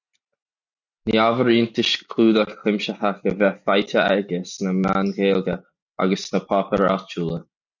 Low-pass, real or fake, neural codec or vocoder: 7.2 kHz; real; none